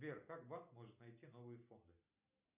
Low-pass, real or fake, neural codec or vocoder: 3.6 kHz; real; none